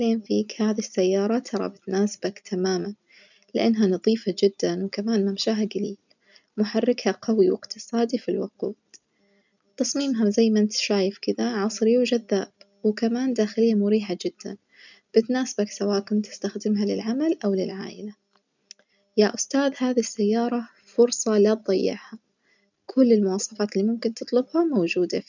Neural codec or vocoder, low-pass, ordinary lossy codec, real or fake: none; 7.2 kHz; none; real